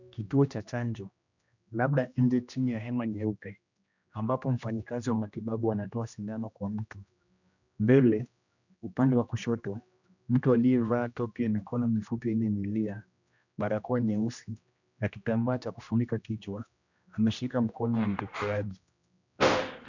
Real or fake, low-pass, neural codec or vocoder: fake; 7.2 kHz; codec, 16 kHz, 1 kbps, X-Codec, HuBERT features, trained on general audio